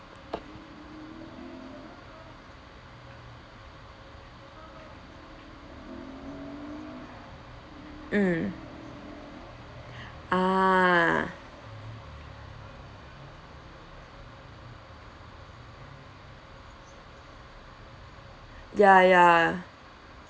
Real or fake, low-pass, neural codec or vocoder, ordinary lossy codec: real; none; none; none